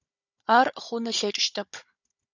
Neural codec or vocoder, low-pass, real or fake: codec, 16 kHz, 16 kbps, FunCodec, trained on Chinese and English, 50 frames a second; 7.2 kHz; fake